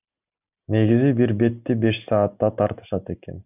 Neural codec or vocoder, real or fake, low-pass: none; real; 3.6 kHz